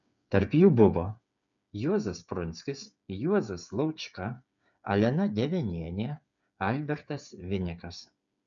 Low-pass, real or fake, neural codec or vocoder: 7.2 kHz; fake; codec, 16 kHz, 8 kbps, FreqCodec, smaller model